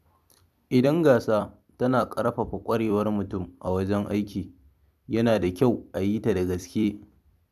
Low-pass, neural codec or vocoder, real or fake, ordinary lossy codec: 14.4 kHz; vocoder, 44.1 kHz, 128 mel bands every 256 samples, BigVGAN v2; fake; none